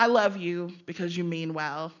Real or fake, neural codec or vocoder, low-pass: real; none; 7.2 kHz